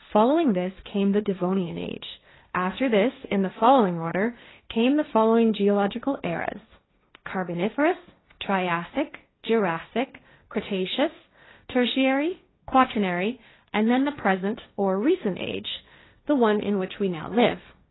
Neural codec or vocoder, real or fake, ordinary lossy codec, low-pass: codec, 16 kHz, 1.1 kbps, Voila-Tokenizer; fake; AAC, 16 kbps; 7.2 kHz